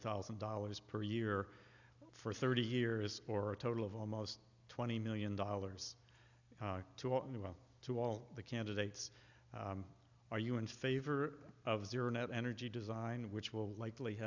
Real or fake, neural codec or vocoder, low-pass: real; none; 7.2 kHz